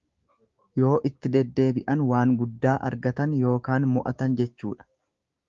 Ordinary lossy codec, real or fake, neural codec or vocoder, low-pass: Opus, 32 kbps; fake; codec, 16 kHz, 6 kbps, DAC; 7.2 kHz